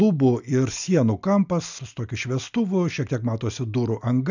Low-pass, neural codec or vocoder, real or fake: 7.2 kHz; none; real